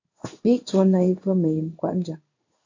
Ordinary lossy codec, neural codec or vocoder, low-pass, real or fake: AAC, 48 kbps; codec, 16 kHz in and 24 kHz out, 1 kbps, XY-Tokenizer; 7.2 kHz; fake